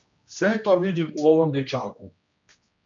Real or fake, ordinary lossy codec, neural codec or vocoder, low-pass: fake; MP3, 64 kbps; codec, 16 kHz, 1 kbps, X-Codec, HuBERT features, trained on general audio; 7.2 kHz